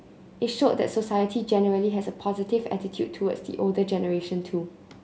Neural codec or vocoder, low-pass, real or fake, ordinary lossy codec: none; none; real; none